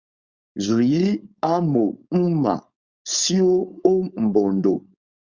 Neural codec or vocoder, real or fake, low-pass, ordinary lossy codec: codec, 16 kHz, 4.8 kbps, FACodec; fake; 7.2 kHz; Opus, 64 kbps